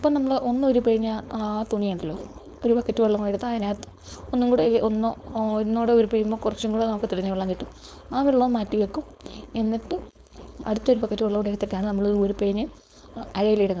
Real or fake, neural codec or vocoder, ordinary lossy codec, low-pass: fake; codec, 16 kHz, 4.8 kbps, FACodec; none; none